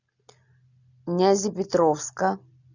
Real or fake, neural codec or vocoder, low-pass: real; none; 7.2 kHz